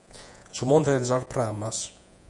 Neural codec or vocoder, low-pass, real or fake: vocoder, 48 kHz, 128 mel bands, Vocos; 10.8 kHz; fake